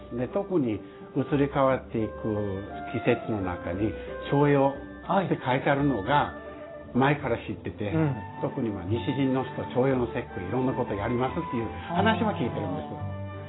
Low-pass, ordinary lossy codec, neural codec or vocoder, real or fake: 7.2 kHz; AAC, 16 kbps; none; real